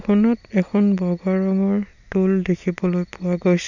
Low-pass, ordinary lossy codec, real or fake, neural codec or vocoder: 7.2 kHz; none; real; none